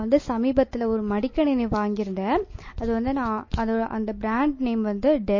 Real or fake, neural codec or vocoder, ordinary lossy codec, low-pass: real; none; MP3, 32 kbps; 7.2 kHz